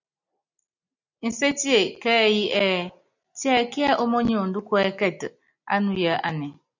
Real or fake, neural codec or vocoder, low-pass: real; none; 7.2 kHz